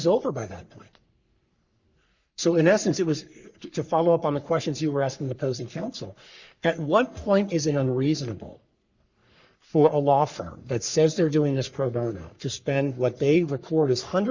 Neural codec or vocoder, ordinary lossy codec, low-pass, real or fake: codec, 44.1 kHz, 3.4 kbps, Pupu-Codec; Opus, 64 kbps; 7.2 kHz; fake